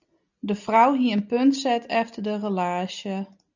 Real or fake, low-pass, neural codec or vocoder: real; 7.2 kHz; none